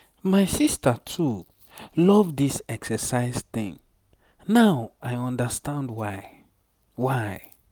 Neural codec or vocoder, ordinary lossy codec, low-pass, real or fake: none; none; none; real